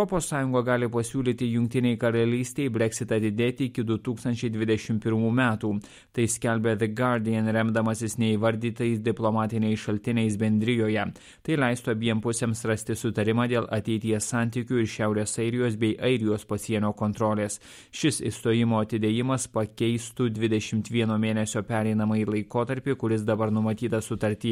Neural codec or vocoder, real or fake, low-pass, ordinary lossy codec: none; real; 19.8 kHz; MP3, 64 kbps